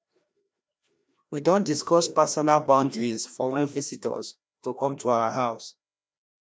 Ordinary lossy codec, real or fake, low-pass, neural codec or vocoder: none; fake; none; codec, 16 kHz, 1 kbps, FreqCodec, larger model